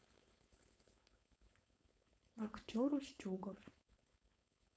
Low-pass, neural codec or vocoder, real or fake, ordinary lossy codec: none; codec, 16 kHz, 4.8 kbps, FACodec; fake; none